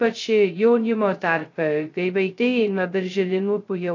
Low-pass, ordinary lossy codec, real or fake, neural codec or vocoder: 7.2 kHz; AAC, 48 kbps; fake; codec, 16 kHz, 0.2 kbps, FocalCodec